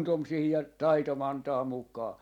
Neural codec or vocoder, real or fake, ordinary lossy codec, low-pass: none; real; none; 19.8 kHz